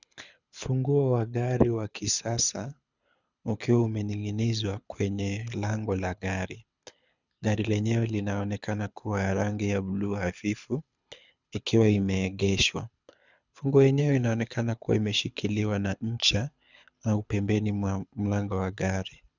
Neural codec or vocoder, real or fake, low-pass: codec, 24 kHz, 6 kbps, HILCodec; fake; 7.2 kHz